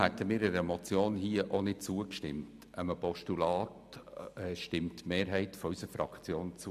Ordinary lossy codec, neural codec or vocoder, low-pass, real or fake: none; none; 14.4 kHz; real